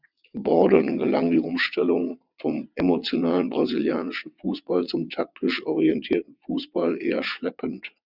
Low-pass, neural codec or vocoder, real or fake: 5.4 kHz; vocoder, 22.05 kHz, 80 mel bands, WaveNeXt; fake